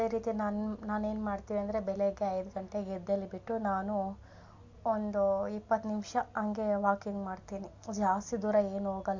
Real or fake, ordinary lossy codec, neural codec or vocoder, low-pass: real; MP3, 48 kbps; none; 7.2 kHz